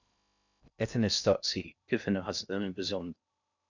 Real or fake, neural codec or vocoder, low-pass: fake; codec, 16 kHz in and 24 kHz out, 0.6 kbps, FocalCodec, streaming, 2048 codes; 7.2 kHz